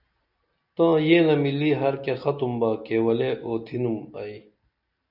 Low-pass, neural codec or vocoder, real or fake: 5.4 kHz; none; real